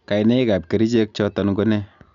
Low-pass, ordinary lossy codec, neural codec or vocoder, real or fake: 7.2 kHz; none; none; real